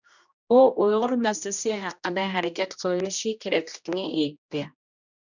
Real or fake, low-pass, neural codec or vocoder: fake; 7.2 kHz; codec, 16 kHz, 1 kbps, X-Codec, HuBERT features, trained on general audio